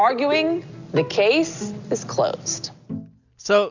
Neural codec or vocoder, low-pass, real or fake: none; 7.2 kHz; real